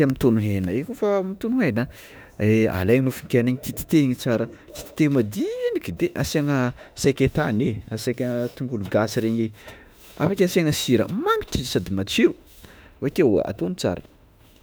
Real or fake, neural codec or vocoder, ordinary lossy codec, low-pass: fake; autoencoder, 48 kHz, 32 numbers a frame, DAC-VAE, trained on Japanese speech; none; none